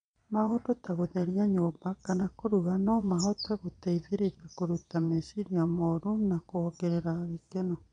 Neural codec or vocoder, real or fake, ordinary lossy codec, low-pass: vocoder, 22.05 kHz, 80 mel bands, Vocos; fake; MP3, 64 kbps; 9.9 kHz